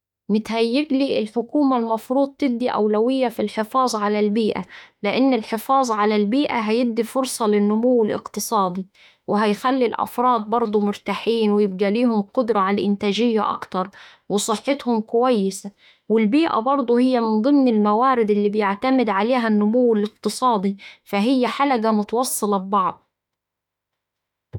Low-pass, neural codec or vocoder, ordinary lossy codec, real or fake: 19.8 kHz; autoencoder, 48 kHz, 32 numbers a frame, DAC-VAE, trained on Japanese speech; none; fake